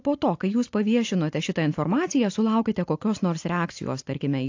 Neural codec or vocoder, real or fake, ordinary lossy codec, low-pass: none; real; AAC, 48 kbps; 7.2 kHz